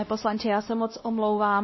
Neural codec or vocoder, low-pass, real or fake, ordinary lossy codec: codec, 16 kHz, 1 kbps, X-Codec, WavLM features, trained on Multilingual LibriSpeech; 7.2 kHz; fake; MP3, 24 kbps